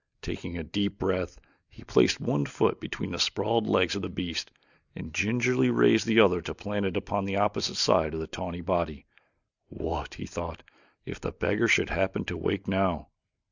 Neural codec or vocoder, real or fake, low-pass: none; real; 7.2 kHz